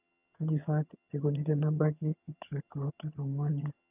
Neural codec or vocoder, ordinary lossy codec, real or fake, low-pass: vocoder, 22.05 kHz, 80 mel bands, HiFi-GAN; none; fake; 3.6 kHz